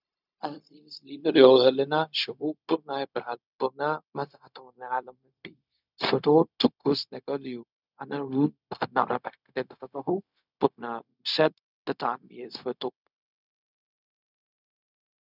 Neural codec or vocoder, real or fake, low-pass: codec, 16 kHz, 0.4 kbps, LongCat-Audio-Codec; fake; 5.4 kHz